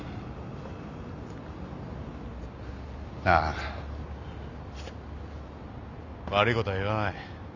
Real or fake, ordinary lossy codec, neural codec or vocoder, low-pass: real; none; none; 7.2 kHz